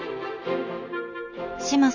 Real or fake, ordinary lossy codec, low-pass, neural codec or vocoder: real; none; 7.2 kHz; none